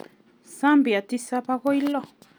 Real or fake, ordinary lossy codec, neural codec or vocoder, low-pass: real; none; none; none